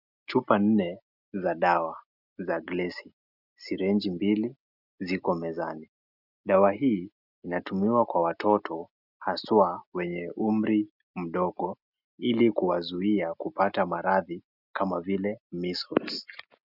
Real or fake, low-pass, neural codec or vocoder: real; 5.4 kHz; none